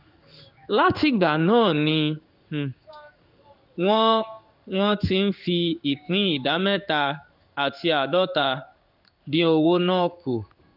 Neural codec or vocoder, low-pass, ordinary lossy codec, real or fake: codec, 16 kHz in and 24 kHz out, 1 kbps, XY-Tokenizer; 5.4 kHz; none; fake